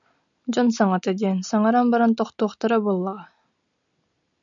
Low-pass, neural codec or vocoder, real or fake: 7.2 kHz; none; real